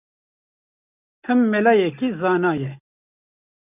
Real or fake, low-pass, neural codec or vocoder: real; 3.6 kHz; none